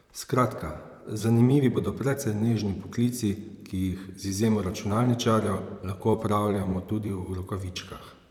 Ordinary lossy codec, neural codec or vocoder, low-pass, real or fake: none; vocoder, 44.1 kHz, 128 mel bands, Pupu-Vocoder; 19.8 kHz; fake